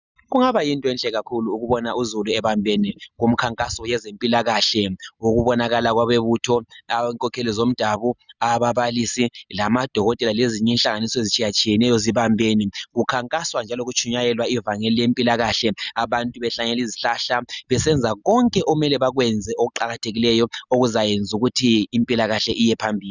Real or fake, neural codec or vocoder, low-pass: real; none; 7.2 kHz